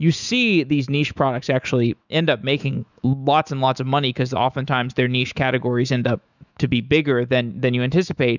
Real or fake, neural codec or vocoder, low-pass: fake; autoencoder, 48 kHz, 128 numbers a frame, DAC-VAE, trained on Japanese speech; 7.2 kHz